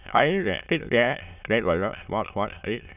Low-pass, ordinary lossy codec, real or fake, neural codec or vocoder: 3.6 kHz; none; fake; autoencoder, 22.05 kHz, a latent of 192 numbers a frame, VITS, trained on many speakers